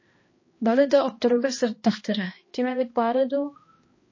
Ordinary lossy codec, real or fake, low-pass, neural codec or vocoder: MP3, 32 kbps; fake; 7.2 kHz; codec, 16 kHz, 1 kbps, X-Codec, HuBERT features, trained on balanced general audio